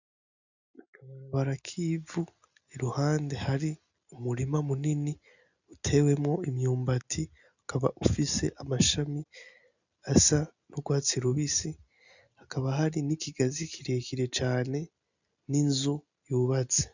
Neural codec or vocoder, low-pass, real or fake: none; 7.2 kHz; real